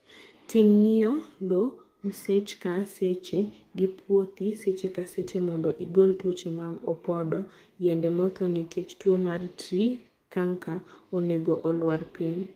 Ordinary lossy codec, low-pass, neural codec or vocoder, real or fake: Opus, 32 kbps; 14.4 kHz; codec, 32 kHz, 1.9 kbps, SNAC; fake